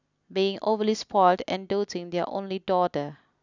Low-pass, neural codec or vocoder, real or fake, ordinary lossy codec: 7.2 kHz; none; real; none